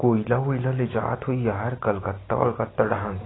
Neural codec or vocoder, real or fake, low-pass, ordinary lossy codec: none; real; 7.2 kHz; AAC, 16 kbps